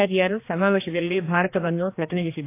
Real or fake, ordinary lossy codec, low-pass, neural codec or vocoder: fake; AAC, 24 kbps; 3.6 kHz; codec, 16 kHz, 2 kbps, X-Codec, HuBERT features, trained on general audio